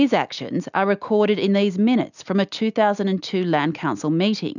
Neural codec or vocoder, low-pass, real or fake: none; 7.2 kHz; real